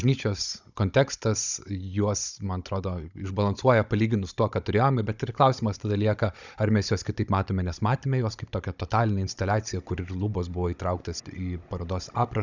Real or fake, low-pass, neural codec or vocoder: fake; 7.2 kHz; codec, 16 kHz, 16 kbps, FunCodec, trained on Chinese and English, 50 frames a second